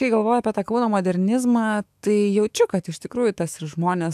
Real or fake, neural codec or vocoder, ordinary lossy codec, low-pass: fake; autoencoder, 48 kHz, 128 numbers a frame, DAC-VAE, trained on Japanese speech; AAC, 96 kbps; 14.4 kHz